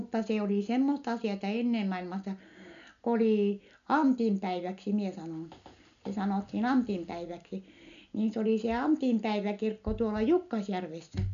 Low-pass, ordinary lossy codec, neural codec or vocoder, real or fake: 7.2 kHz; none; none; real